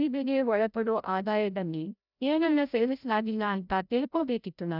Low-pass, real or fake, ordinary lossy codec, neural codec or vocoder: 5.4 kHz; fake; none; codec, 16 kHz, 0.5 kbps, FreqCodec, larger model